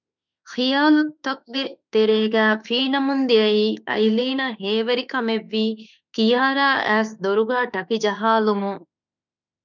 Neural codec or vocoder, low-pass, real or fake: autoencoder, 48 kHz, 32 numbers a frame, DAC-VAE, trained on Japanese speech; 7.2 kHz; fake